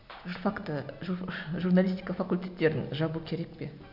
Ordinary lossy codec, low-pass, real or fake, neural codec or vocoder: none; 5.4 kHz; real; none